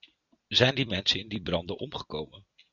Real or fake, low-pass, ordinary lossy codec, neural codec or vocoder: real; 7.2 kHz; Opus, 64 kbps; none